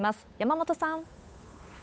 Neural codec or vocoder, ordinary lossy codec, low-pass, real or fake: codec, 16 kHz, 8 kbps, FunCodec, trained on Chinese and English, 25 frames a second; none; none; fake